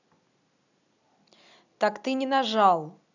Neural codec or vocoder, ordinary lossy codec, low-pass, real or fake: none; none; 7.2 kHz; real